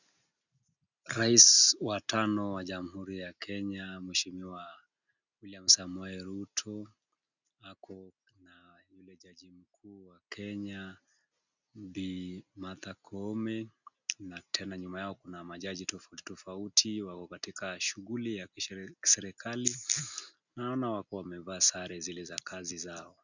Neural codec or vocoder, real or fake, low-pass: none; real; 7.2 kHz